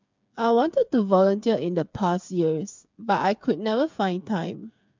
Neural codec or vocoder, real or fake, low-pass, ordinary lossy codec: codec, 16 kHz, 8 kbps, FreqCodec, smaller model; fake; 7.2 kHz; MP3, 64 kbps